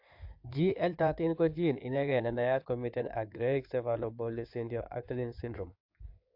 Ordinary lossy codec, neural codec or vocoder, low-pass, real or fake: AAC, 48 kbps; codec, 16 kHz in and 24 kHz out, 2.2 kbps, FireRedTTS-2 codec; 5.4 kHz; fake